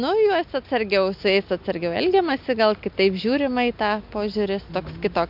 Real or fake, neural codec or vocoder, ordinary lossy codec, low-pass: real; none; MP3, 48 kbps; 5.4 kHz